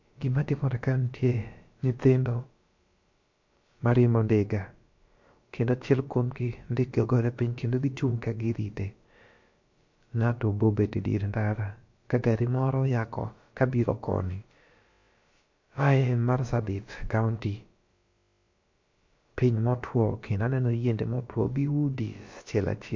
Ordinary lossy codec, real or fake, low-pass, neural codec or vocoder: MP3, 48 kbps; fake; 7.2 kHz; codec, 16 kHz, about 1 kbps, DyCAST, with the encoder's durations